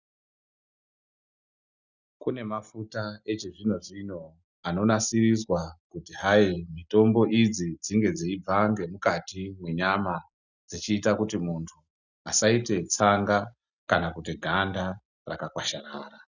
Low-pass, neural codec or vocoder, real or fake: 7.2 kHz; none; real